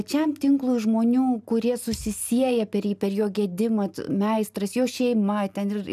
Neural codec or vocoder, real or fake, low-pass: none; real; 14.4 kHz